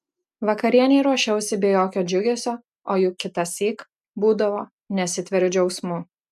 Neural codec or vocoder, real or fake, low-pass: none; real; 14.4 kHz